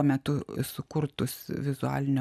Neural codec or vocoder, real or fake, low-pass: none; real; 14.4 kHz